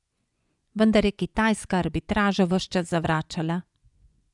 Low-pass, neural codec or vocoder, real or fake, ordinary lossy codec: 10.8 kHz; vocoder, 24 kHz, 100 mel bands, Vocos; fake; none